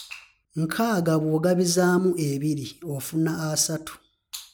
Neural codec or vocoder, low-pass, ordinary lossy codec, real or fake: none; none; none; real